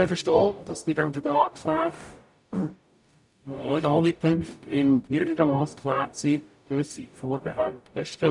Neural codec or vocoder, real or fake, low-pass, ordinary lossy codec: codec, 44.1 kHz, 0.9 kbps, DAC; fake; 10.8 kHz; none